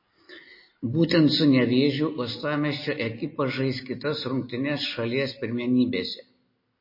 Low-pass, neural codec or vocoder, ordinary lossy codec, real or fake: 5.4 kHz; none; MP3, 24 kbps; real